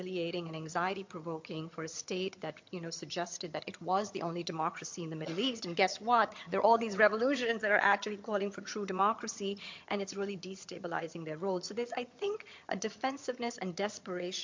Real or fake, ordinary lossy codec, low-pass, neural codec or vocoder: fake; MP3, 48 kbps; 7.2 kHz; vocoder, 22.05 kHz, 80 mel bands, HiFi-GAN